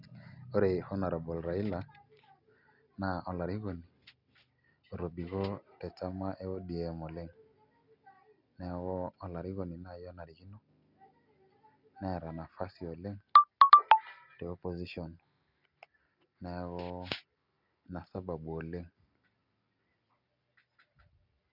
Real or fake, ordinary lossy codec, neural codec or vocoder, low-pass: real; none; none; 5.4 kHz